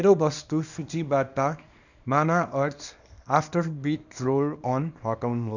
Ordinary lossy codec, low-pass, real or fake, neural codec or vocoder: none; 7.2 kHz; fake; codec, 24 kHz, 0.9 kbps, WavTokenizer, small release